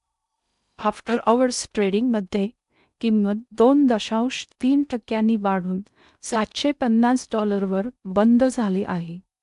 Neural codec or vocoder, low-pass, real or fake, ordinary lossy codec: codec, 16 kHz in and 24 kHz out, 0.6 kbps, FocalCodec, streaming, 2048 codes; 10.8 kHz; fake; AAC, 96 kbps